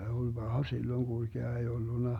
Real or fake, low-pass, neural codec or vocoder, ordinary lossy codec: real; 19.8 kHz; none; none